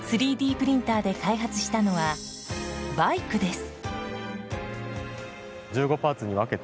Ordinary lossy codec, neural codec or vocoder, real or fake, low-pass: none; none; real; none